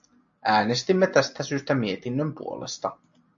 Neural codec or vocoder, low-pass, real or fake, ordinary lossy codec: none; 7.2 kHz; real; AAC, 64 kbps